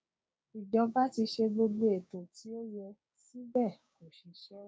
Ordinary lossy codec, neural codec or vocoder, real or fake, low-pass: none; codec, 16 kHz, 6 kbps, DAC; fake; none